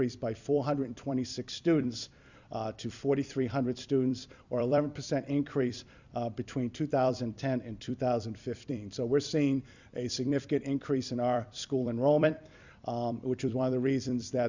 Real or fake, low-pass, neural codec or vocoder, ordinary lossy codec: fake; 7.2 kHz; vocoder, 44.1 kHz, 128 mel bands every 256 samples, BigVGAN v2; Opus, 64 kbps